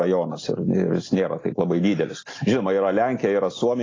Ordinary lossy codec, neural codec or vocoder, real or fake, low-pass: AAC, 32 kbps; none; real; 7.2 kHz